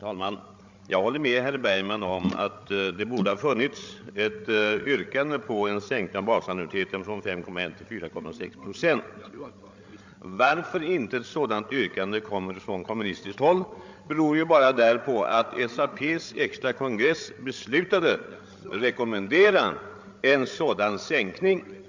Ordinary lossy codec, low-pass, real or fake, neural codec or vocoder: MP3, 64 kbps; 7.2 kHz; fake; codec, 16 kHz, 16 kbps, FreqCodec, larger model